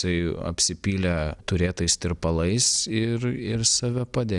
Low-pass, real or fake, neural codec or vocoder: 10.8 kHz; real; none